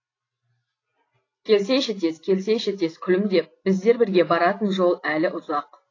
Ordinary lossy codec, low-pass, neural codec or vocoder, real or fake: AAC, 32 kbps; 7.2 kHz; none; real